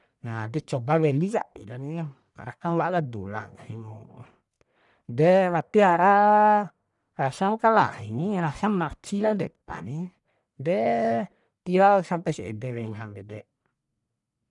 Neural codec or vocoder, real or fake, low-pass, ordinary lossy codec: codec, 44.1 kHz, 1.7 kbps, Pupu-Codec; fake; 10.8 kHz; none